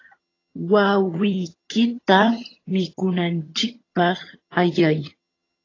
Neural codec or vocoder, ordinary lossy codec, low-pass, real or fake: vocoder, 22.05 kHz, 80 mel bands, HiFi-GAN; AAC, 32 kbps; 7.2 kHz; fake